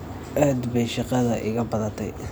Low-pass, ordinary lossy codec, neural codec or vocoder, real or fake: none; none; none; real